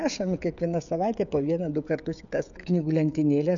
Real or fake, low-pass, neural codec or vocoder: fake; 7.2 kHz; codec, 16 kHz, 16 kbps, FreqCodec, smaller model